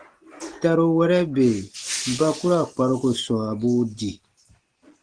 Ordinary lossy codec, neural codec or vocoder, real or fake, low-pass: Opus, 16 kbps; none; real; 9.9 kHz